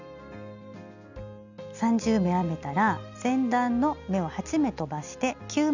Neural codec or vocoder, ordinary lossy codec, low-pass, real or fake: none; none; 7.2 kHz; real